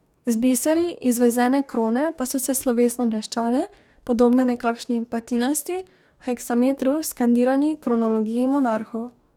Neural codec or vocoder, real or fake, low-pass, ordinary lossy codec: codec, 44.1 kHz, 2.6 kbps, DAC; fake; 19.8 kHz; none